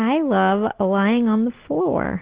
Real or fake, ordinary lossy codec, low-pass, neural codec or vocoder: real; Opus, 16 kbps; 3.6 kHz; none